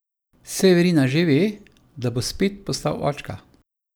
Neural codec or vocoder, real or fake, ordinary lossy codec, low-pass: none; real; none; none